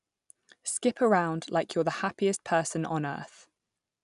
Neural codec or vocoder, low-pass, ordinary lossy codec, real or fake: none; 10.8 kHz; none; real